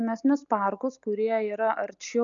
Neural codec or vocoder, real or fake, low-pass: none; real; 7.2 kHz